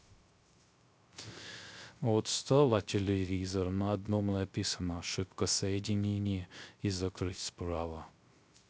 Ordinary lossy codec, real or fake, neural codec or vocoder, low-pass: none; fake; codec, 16 kHz, 0.3 kbps, FocalCodec; none